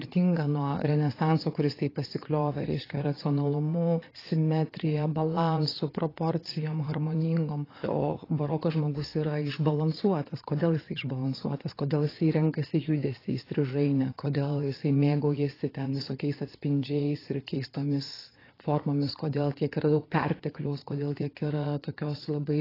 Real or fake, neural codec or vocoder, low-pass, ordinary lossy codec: fake; vocoder, 22.05 kHz, 80 mel bands, Vocos; 5.4 kHz; AAC, 24 kbps